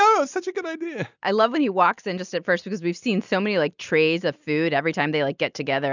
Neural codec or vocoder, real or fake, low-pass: none; real; 7.2 kHz